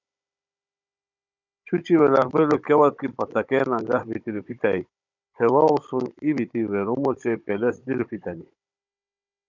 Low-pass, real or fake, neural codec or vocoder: 7.2 kHz; fake; codec, 16 kHz, 16 kbps, FunCodec, trained on Chinese and English, 50 frames a second